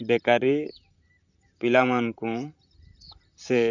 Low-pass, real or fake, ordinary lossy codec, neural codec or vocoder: 7.2 kHz; fake; none; vocoder, 44.1 kHz, 128 mel bands, Pupu-Vocoder